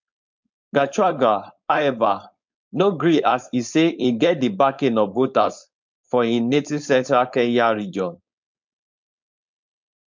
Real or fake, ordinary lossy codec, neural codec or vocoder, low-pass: fake; MP3, 64 kbps; codec, 16 kHz, 4.8 kbps, FACodec; 7.2 kHz